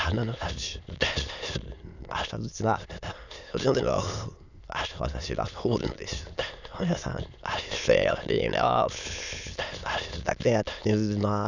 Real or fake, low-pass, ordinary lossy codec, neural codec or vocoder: fake; 7.2 kHz; none; autoencoder, 22.05 kHz, a latent of 192 numbers a frame, VITS, trained on many speakers